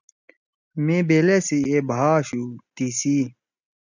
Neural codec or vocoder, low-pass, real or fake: none; 7.2 kHz; real